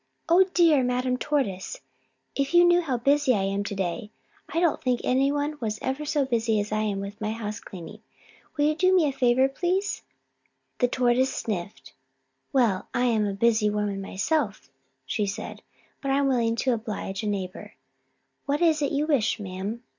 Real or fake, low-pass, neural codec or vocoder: real; 7.2 kHz; none